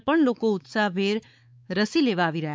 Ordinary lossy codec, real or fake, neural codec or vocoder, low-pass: none; fake; codec, 16 kHz, 4 kbps, X-Codec, WavLM features, trained on Multilingual LibriSpeech; none